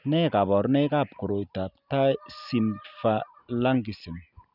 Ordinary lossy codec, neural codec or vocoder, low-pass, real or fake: none; none; 5.4 kHz; real